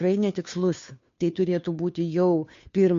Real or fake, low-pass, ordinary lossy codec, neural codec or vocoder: fake; 7.2 kHz; MP3, 48 kbps; codec, 16 kHz, 2 kbps, FunCodec, trained on Chinese and English, 25 frames a second